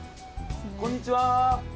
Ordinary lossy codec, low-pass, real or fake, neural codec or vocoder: none; none; real; none